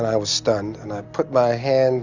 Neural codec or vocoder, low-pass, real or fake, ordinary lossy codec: none; 7.2 kHz; real; Opus, 64 kbps